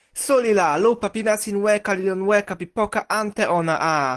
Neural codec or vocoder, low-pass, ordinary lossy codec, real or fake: none; 10.8 kHz; Opus, 16 kbps; real